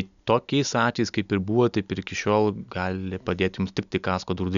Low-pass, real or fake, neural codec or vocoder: 7.2 kHz; real; none